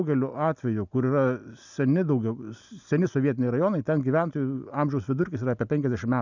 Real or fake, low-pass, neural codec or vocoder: real; 7.2 kHz; none